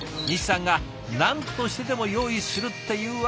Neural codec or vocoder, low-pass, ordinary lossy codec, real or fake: none; none; none; real